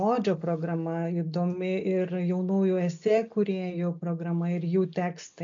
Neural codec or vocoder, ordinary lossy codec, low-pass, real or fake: none; MP3, 64 kbps; 7.2 kHz; real